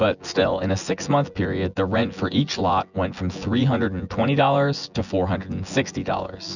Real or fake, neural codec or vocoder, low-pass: fake; vocoder, 24 kHz, 100 mel bands, Vocos; 7.2 kHz